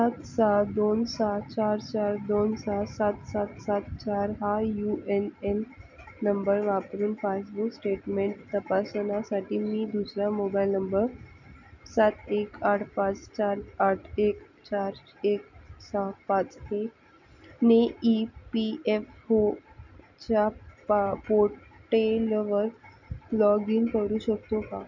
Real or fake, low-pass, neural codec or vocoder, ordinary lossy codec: real; 7.2 kHz; none; none